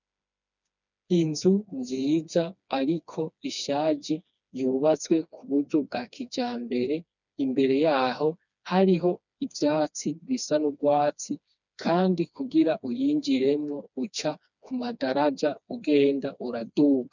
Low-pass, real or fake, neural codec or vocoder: 7.2 kHz; fake; codec, 16 kHz, 2 kbps, FreqCodec, smaller model